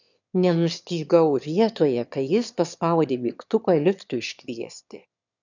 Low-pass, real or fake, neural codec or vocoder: 7.2 kHz; fake; autoencoder, 22.05 kHz, a latent of 192 numbers a frame, VITS, trained on one speaker